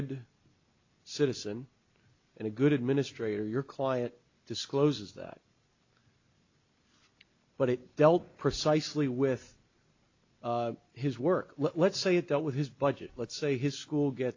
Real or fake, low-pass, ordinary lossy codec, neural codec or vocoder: real; 7.2 kHz; AAC, 48 kbps; none